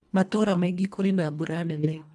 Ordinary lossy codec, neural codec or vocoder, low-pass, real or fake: none; codec, 24 kHz, 1.5 kbps, HILCodec; none; fake